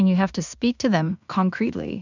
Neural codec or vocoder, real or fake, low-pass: codec, 16 kHz in and 24 kHz out, 0.4 kbps, LongCat-Audio-Codec, two codebook decoder; fake; 7.2 kHz